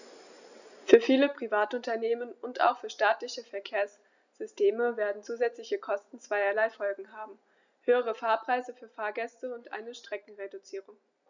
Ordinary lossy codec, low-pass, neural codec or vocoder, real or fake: none; 7.2 kHz; none; real